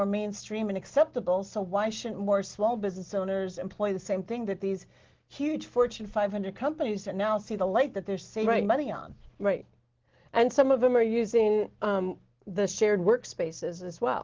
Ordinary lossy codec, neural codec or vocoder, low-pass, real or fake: Opus, 16 kbps; none; 7.2 kHz; real